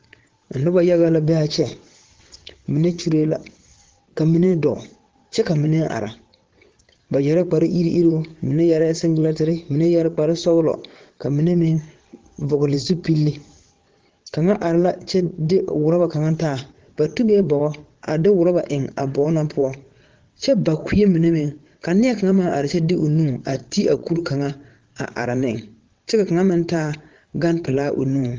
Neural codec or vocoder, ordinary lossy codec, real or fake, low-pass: vocoder, 22.05 kHz, 80 mel bands, WaveNeXt; Opus, 16 kbps; fake; 7.2 kHz